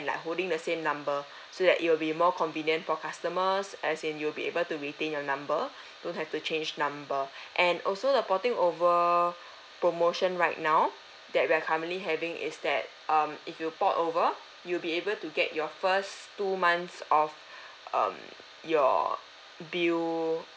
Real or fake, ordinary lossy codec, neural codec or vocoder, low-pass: real; none; none; none